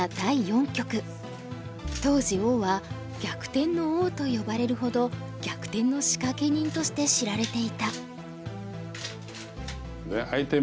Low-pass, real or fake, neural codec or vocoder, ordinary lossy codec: none; real; none; none